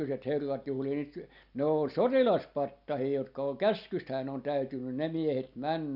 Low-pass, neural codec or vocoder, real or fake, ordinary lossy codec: 5.4 kHz; none; real; none